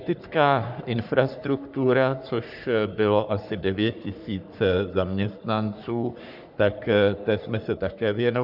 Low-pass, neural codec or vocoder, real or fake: 5.4 kHz; codec, 44.1 kHz, 3.4 kbps, Pupu-Codec; fake